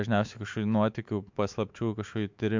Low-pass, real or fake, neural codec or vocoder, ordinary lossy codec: 7.2 kHz; fake; autoencoder, 48 kHz, 128 numbers a frame, DAC-VAE, trained on Japanese speech; MP3, 64 kbps